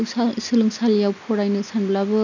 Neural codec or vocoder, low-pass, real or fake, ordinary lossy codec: none; 7.2 kHz; real; none